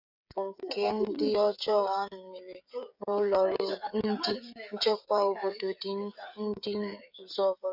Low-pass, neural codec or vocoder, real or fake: 5.4 kHz; codec, 16 kHz, 16 kbps, FreqCodec, smaller model; fake